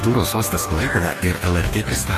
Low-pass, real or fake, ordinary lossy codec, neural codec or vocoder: 14.4 kHz; fake; AAC, 48 kbps; codec, 44.1 kHz, 2.6 kbps, DAC